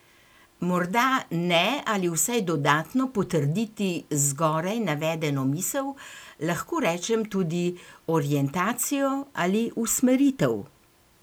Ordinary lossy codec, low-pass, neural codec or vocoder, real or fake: none; none; none; real